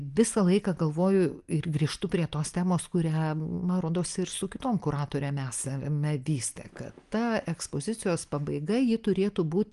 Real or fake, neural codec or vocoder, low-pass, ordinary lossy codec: real; none; 10.8 kHz; Opus, 32 kbps